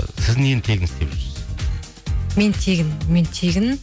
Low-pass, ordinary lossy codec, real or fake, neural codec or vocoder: none; none; real; none